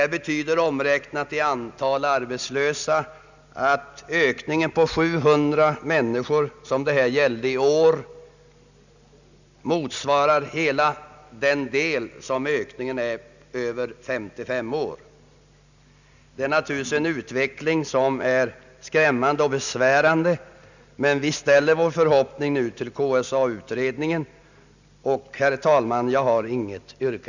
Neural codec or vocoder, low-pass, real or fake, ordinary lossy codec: none; 7.2 kHz; real; none